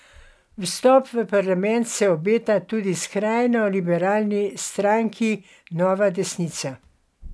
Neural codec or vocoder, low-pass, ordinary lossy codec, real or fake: none; none; none; real